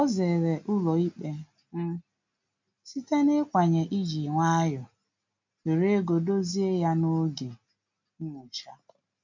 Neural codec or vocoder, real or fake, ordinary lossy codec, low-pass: none; real; MP3, 64 kbps; 7.2 kHz